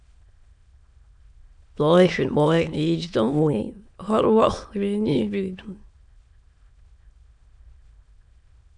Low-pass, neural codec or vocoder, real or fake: 9.9 kHz; autoencoder, 22.05 kHz, a latent of 192 numbers a frame, VITS, trained on many speakers; fake